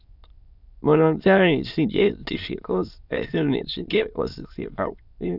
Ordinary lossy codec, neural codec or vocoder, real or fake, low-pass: none; autoencoder, 22.05 kHz, a latent of 192 numbers a frame, VITS, trained on many speakers; fake; 5.4 kHz